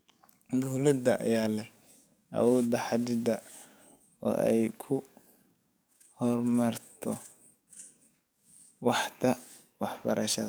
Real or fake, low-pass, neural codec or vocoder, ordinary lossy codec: fake; none; codec, 44.1 kHz, 7.8 kbps, DAC; none